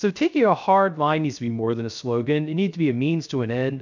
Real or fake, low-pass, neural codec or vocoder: fake; 7.2 kHz; codec, 16 kHz, 0.3 kbps, FocalCodec